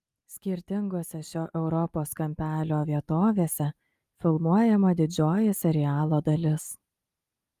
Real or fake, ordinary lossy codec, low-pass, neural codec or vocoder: real; Opus, 32 kbps; 14.4 kHz; none